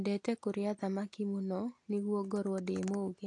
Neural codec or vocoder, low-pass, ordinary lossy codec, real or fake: none; 10.8 kHz; AAC, 48 kbps; real